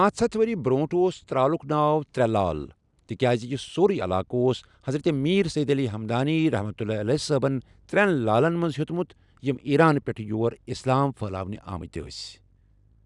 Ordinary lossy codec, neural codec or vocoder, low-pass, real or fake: none; none; 10.8 kHz; real